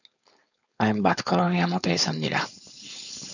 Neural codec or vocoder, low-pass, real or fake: codec, 16 kHz, 4.8 kbps, FACodec; 7.2 kHz; fake